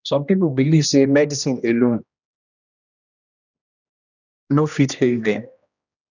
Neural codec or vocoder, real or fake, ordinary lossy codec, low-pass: codec, 16 kHz, 1 kbps, X-Codec, HuBERT features, trained on general audio; fake; none; 7.2 kHz